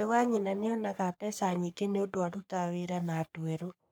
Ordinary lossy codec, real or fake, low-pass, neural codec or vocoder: none; fake; none; codec, 44.1 kHz, 3.4 kbps, Pupu-Codec